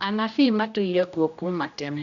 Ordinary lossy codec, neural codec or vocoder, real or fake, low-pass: none; codec, 16 kHz, 1 kbps, X-Codec, HuBERT features, trained on general audio; fake; 7.2 kHz